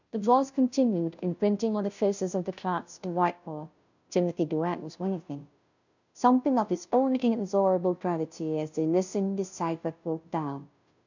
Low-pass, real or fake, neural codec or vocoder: 7.2 kHz; fake; codec, 16 kHz, 0.5 kbps, FunCodec, trained on Chinese and English, 25 frames a second